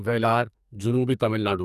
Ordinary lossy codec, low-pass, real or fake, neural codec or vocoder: none; 14.4 kHz; fake; codec, 44.1 kHz, 2.6 kbps, SNAC